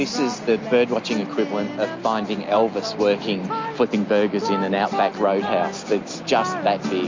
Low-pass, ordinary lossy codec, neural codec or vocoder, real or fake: 7.2 kHz; MP3, 48 kbps; none; real